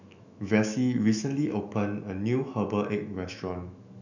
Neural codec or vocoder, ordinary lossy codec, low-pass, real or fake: autoencoder, 48 kHz, 128 numbers a frame, DAC-VAE, trained on Japanese speech; none; 7.2 kHz; fake